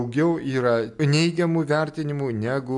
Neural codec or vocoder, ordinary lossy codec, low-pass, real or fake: none; AAC, 64 kbps; 10.8 kHz; real